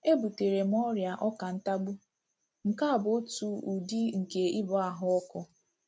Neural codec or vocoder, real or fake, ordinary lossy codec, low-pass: none; real; none; none